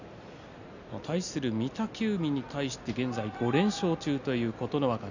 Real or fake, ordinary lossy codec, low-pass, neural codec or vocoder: real; none; 7.2 kHz; none